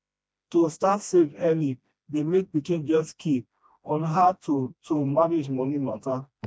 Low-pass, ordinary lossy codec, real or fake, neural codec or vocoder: none; none; fake; codec, 16 kHz, 1 kbps, FreqCodec, smaller model